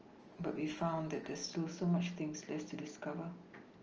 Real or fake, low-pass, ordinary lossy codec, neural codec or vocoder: real; 7.2 kHz; Opus, 24 kbps; none